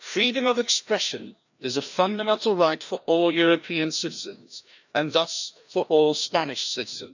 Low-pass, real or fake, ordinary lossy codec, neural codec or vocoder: 7.2 kHz; fake; none; codec, 16 kHz, 1 kbps, FreqCodec, larger model